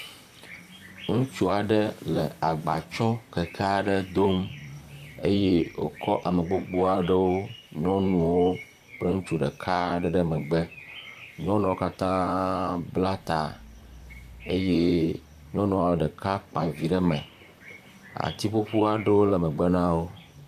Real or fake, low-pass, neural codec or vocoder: fake; 14.4 kHz; vocoder, 44.1 kHz, 128 mel bands, Pupu-Vocoder